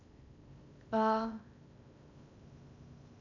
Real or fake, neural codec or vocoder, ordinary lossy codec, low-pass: fake; codec, 16 kHz in and 24 kHz out, 0.6 kbps, FocalCodec, streaming, 2048 codes; none; 7.2 kHz